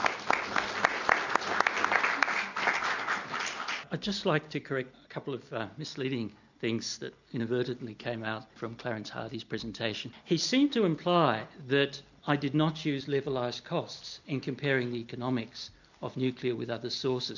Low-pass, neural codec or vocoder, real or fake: 7.2 kHz; none; real